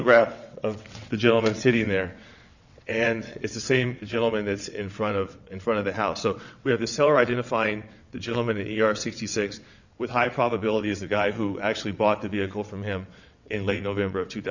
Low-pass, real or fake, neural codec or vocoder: 7.2 kHz; fake; vocoder, 22.05 kHz, 80 mel bands, WaveNeXt